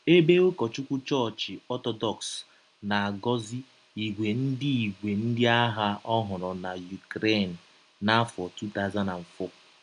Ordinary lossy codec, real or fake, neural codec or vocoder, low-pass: none; real; none; 9.9 kHz